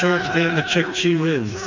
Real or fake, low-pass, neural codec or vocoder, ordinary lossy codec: fake; 7.2 kHz; codec, 16 kHz, 2 kbps, FreqCodec, smaller model; MP3, 64 kbps